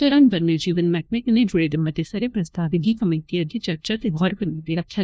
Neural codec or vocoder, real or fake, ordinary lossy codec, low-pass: codec, 16 kHz, 1 kbps, FunCodec, trained on LibriTTS, 50 frames a second; fake; none; none